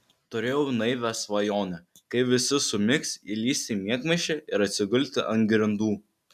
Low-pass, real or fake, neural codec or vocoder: 14.4 kHz; real; none